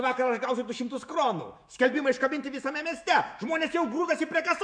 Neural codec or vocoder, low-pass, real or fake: autoencoder, 48 kHz, 128 numbers a frame, DAC-VAE, trained on Japanese speech; 9.9 kHz; fake